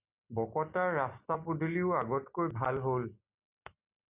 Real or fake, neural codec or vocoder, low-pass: real; none; 3.6 kHz